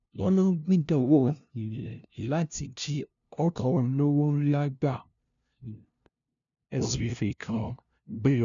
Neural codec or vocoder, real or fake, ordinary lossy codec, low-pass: codec, 16 kHz, 0.5 kbps, FunCodec, trained on LibriTTS, 25 frames a second; fake; none; 7.2 kHz